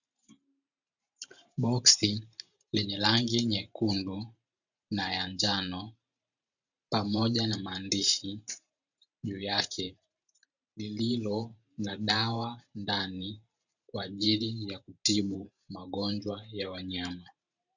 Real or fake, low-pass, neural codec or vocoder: fake; 7.2 kHz; vocoder, 44.1 kHz, 128 mel bands every 512 samples, BigVGAN v2